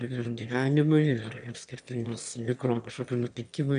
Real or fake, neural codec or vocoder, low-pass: fake; autoencoder, 22.05 kHz, a latent of 192 numbers a frame, VITS, trained on one speaker; 9.9 kHz